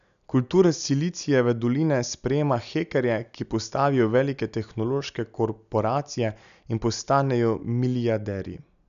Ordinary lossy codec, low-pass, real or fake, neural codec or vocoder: none; 7.2 kHz; real; none